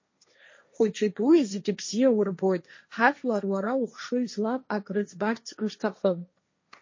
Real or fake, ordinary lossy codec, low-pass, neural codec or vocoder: fake; MP3, 32 kbps; 7.2 kHz; codec, 16 kHz, 1.1 kbps, Voila-Tokenizer